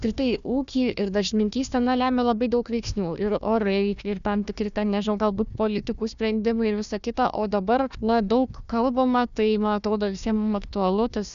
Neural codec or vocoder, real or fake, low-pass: codec, 16 kHz, 1 kbps, FunCodec, trained on Chinese and English, 50 frames a second; fake; 7.2 kHz